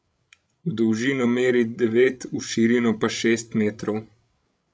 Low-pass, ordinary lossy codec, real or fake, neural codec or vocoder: none; none; fake; codec, 16 kHz, 16 kbps, FreqCodec, larger model